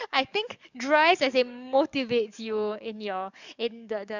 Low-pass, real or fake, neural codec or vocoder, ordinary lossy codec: 7.2 kHz; fake; codec, 44.1 kHz, 7.8 kbps, DAC; none